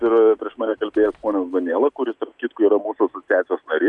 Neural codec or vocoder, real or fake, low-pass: none; real; 10.8 kHz